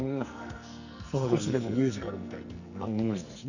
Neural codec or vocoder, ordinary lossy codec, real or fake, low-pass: codec, 44.1 kHz, 2.6 kbps, SNAC; none; fake; 7.2 kHz